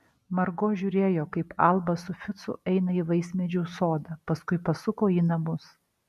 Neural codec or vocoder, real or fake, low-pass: none; real; 14.4 kHz